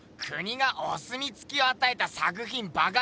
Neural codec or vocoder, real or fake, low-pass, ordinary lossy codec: none; real; none; none